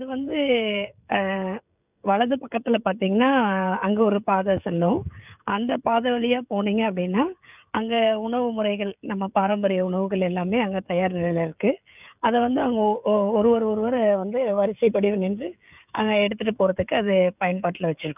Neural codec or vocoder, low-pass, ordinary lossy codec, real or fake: codec, 16 kHz, 16 kbps, FreqCodec, smaller model; 3.6 kHz; none; fake